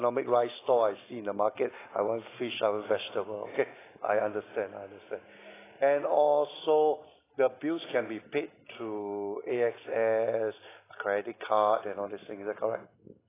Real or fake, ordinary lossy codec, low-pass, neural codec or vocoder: real; AAC, 16 kbps; 3.6 kHz; none